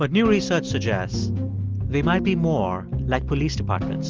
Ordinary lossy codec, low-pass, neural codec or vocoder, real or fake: Opus, 32 kbps; 7.2 kHz; none; real